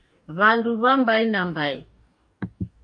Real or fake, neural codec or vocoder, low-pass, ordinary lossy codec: fake; codec, 44.1 kHz, 2.6 kbps, DAC; 9.9 kHz; AAC, 64 kbps